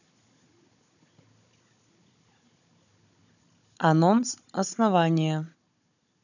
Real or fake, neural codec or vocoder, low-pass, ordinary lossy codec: fake; codec, 16 kHz, 16 kbps, FunCodec, trained on Chinese and English, 50 frames a second; 7.2 kHz; none